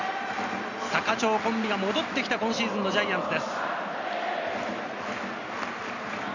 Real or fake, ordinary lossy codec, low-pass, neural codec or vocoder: real; none; 7.2 kHz; none